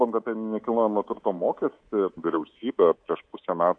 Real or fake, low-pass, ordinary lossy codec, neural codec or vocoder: fake; 9.9 kHz; AAC, 48 kbps; codec, 24 kHz, 3.1 kbps, DualCodec